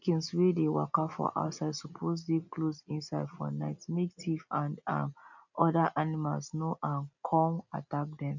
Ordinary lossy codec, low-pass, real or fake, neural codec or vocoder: none; 7.2 kHz; real; none